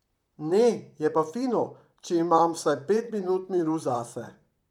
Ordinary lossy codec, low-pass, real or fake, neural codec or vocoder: none; 19.8 kHz; fake; vocoder, 44.1 kHz, 128 mel bands, Pupu-Vocoder